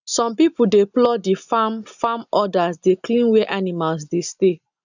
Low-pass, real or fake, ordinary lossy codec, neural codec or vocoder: 7.2 kHz; real; none; none